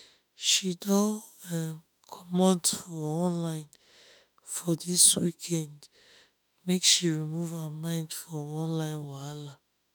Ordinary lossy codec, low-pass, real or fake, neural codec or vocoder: none; none; fake; autoencoder, 48 kHz, 32 numbers a frame, DAC-VAE, trained on Japanese speech